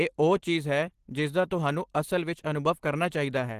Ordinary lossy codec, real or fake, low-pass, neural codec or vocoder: Opus, 16 kbps; real; 14.4 kHz; none